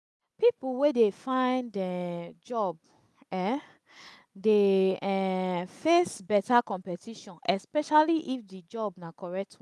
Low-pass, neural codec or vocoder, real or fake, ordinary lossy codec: none; none; real; none